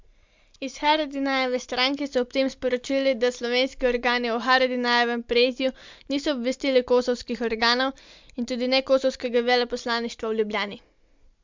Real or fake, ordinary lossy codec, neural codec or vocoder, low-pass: real; MP3, 64 kbps; none; 7.2 kHz